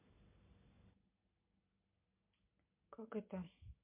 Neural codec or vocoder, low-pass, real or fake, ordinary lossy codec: none; 3.6 kHz; real; MP3, 32 kbps